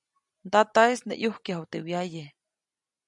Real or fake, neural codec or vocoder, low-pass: real; none; 10.8 kHz